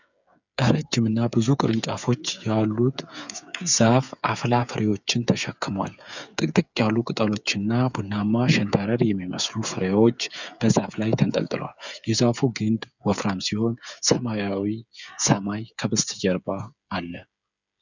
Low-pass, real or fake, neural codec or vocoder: 7.2 kHz; fake; codec, 16 kHz, 8 kbps, FreqCodec, smaller model